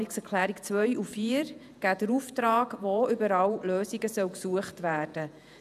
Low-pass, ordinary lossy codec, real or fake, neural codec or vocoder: 14.4 kHz; none; fake; vocoder, 48 kHz, 128 mel bands, Vocos